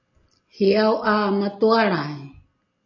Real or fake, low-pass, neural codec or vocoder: real; 7.2 kHz; none